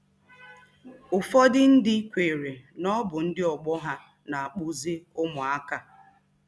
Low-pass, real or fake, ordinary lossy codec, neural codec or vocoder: none; real; none; none